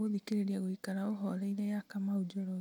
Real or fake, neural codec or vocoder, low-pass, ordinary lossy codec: real; none; 19.8 kHz; none